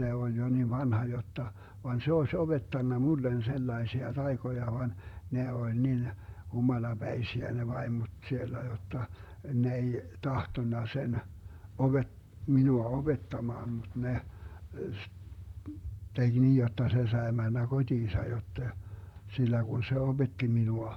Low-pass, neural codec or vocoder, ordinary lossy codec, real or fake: 19.8 kHz; vocoder, 44.1 kHz, 128 mel bands, Pupu-Vocoder; none; fake